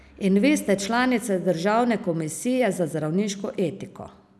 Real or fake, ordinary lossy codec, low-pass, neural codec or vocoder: real; none; none; none